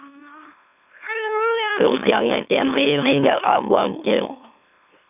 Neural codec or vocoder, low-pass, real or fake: autoencoder, 44.1 kHz, a latent of 192 numbers a frame, MeloTTS; 3.6 kHz; fake